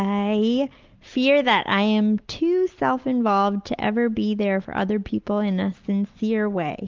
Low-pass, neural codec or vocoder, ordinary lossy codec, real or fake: 7.2 kHz; none; Opus, 24 kbps; real